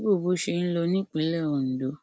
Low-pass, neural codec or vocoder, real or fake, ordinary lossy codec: none; none; real; none